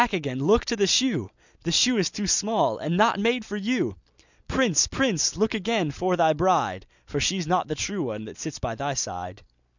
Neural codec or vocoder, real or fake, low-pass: none; real; 7.2 kHz